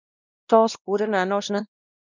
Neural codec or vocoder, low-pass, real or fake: codec, 16 kHz, 1 kbps, X-Codec, WavLM features, trained on Multilingual LibriSpeech; 7.2 kHz; fake